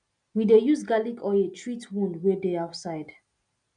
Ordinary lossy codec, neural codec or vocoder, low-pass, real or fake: MP3, 96 kbps; none; 9.9 kHz; real